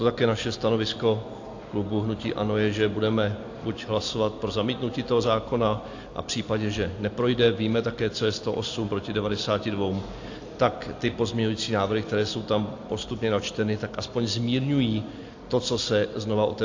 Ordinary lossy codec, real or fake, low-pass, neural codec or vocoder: AAC, 48 kbps; real; 7.2 kHz; none